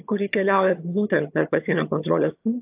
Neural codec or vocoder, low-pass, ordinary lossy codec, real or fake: vocoder, 22.05 kHz, 80 mel bands, HiFi-GAN; 3.6 kHz; AAC, 32 kbps; fake